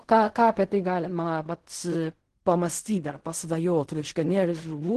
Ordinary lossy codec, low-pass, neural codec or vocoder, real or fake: Opus, 16 kbps; 10.8 kHz; codec, 16 kHz in and 24 kHz out, 0.4 kbps, LongCat-Audio-Codec, fine tuned four codebook decoder; fake